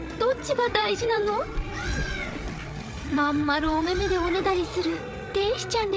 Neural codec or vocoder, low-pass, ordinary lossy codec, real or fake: codec, 16 kHz, 8 kbps, FreqCodec, larger model; none; none; fake